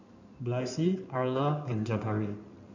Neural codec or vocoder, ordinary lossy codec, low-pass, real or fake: codec, 16 kHz in and 24 kHz out, 2.2 kbps, FireRedTTS-2 codec; none; 7.2 kHz; fake